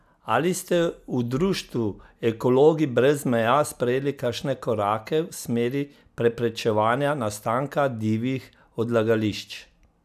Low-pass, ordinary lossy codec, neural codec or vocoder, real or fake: 14.4 kHz; none; none; real